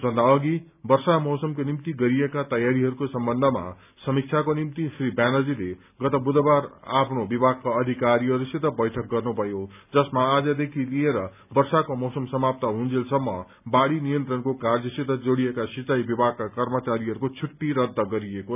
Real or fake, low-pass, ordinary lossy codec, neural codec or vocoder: real; 3.6 kHz; none; none